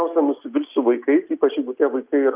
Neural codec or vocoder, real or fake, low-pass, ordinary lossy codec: none; real; 3.6 kHz; Opus, 24 kbps